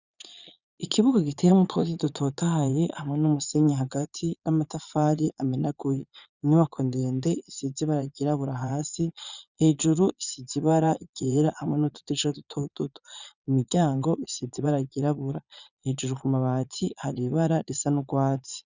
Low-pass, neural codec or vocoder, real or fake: 7.2 kHz; vocoder, 22.05 kHz, 80 mel bands, Vocos; fake